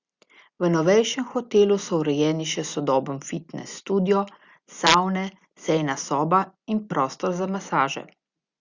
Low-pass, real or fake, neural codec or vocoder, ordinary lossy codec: 7.2 kHz; real; none; Opus, 64 kbps